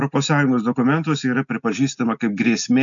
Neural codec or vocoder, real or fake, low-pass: none; real; 7.2 kHz